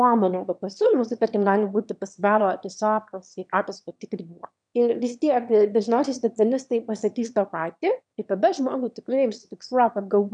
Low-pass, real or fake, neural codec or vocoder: 9.9 kHz; fake; autoencoder, 22.05 kHz, a latent of 192 numbers a frame, VITS, trained on one speaker